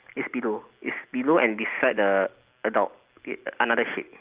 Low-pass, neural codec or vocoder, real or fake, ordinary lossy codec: 3.6 kHz; none; real; Opus, 24 kbps